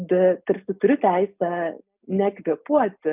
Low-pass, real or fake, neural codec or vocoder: 3.6 kHz; real; none